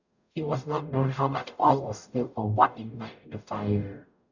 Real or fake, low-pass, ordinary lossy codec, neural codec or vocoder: fake; 7.2 kHz; none; codec, 44.1 kHz, 0.9 kbps, DAC